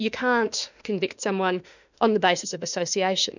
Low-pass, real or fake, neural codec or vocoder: 7.2 kHz; fake; autoencoder, 48 kHz, 32 numbers a frame, DAC-VAE, trained on Japanese speech